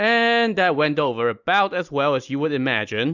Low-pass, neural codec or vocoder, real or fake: 7.2 kHz; none; real